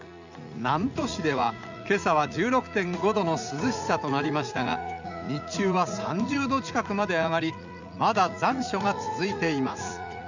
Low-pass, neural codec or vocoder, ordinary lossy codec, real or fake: 7.2 kHz; vocoder, 44.1 kHz, 80 mel bands, Vocos; none; fake